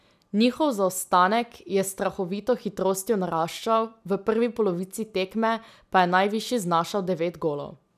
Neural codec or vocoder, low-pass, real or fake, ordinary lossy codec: none; 14.4 kHz; real; none